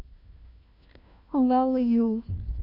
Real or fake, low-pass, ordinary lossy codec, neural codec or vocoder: fake; 5.4 kHz; none; codec, 16 kHz, 1 kbps, FunCodec, trained on LibriTTS, 50 frames a second